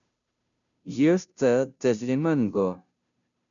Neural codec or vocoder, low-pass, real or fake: codec, 16 kHz, 0.5 kbps, FunCodec, trained on Chinese and English, 25 frames a second; 7.2 kHz; fake